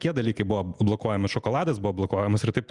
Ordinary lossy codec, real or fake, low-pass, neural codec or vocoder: Opus, 64 kbps; real; 10.8 kHz; none